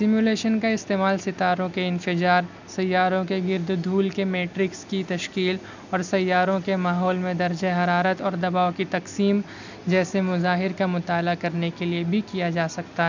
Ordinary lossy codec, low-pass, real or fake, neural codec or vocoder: none; 7.2 kHz; real; none